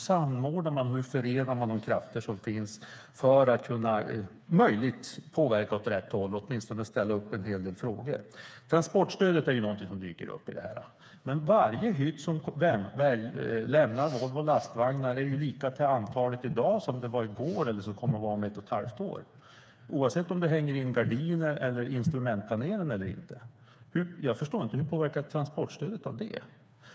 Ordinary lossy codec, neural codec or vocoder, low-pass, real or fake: none; codec, 16 kHz, 4 kbps, FreqCodec, smaller model; none; fake